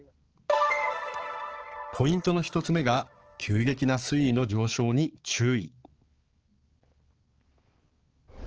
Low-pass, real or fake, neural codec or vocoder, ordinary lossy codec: 7.2 kHz; fake; codec, 16 kHz, 4 kbps, X-Codec, HuBERT features, trained on balanced general audio; Opus, 16 kbps